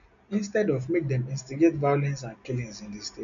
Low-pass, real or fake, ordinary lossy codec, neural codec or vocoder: 7.2 kHz; real; none; none